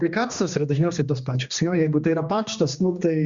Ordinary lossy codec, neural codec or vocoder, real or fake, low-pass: Opus, 64 kbps; codec, 16 kHz, 2 kbps, X-Codec, HuBERT features, trained on general audio; fake; 7.2 kHz